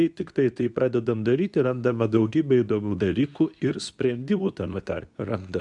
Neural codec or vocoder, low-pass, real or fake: codec, 24 kHz, 0.9 kbps, WavTokenizer, medium speech release version 2; 10.8 kHz; fake